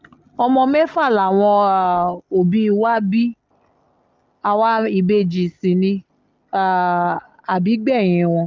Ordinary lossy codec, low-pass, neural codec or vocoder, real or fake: Opus, 24 kbps; 7.2 kHz; none; real